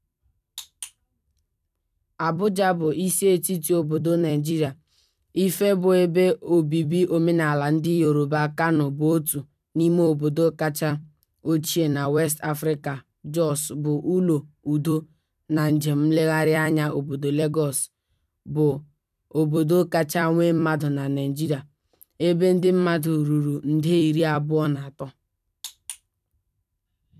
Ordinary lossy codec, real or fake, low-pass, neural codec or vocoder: none; fake; 14.4 kHz; vocoder, 44.1 kHz, 128 mel bands every 256 samples, BigVGAN v2